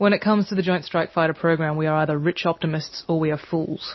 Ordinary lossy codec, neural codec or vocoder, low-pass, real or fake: MP3, 24 kbps; none; 7.2 kHz; real